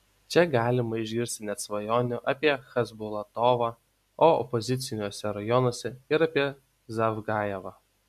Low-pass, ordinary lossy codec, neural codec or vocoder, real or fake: 14.4 kHz; MP3, 96 kbps; none; real